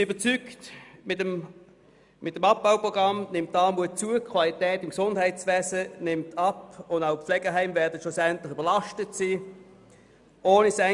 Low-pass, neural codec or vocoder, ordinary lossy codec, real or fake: 10.8 kHz; none; none; real